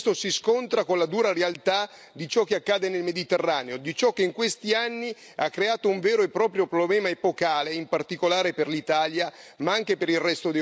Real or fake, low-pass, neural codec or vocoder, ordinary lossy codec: real; none; none; none